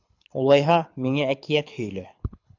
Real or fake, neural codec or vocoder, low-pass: fake; codec, 24 kHz, 6 kbps, HILCodec; 7.2 kHz